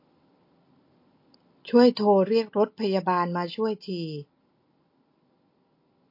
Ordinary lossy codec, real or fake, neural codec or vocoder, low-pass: MP3, 32 kbps; real; none; 5.4 kHz